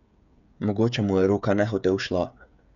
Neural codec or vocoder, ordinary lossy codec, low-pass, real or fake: codec, 16 kHz, 16 kbps, FreqCodec, smaller model; MP3, 64 kbps; 7.2 kHz; fake